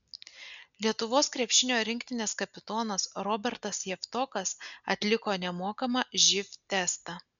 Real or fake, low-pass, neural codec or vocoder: real; 7.2 kHz; none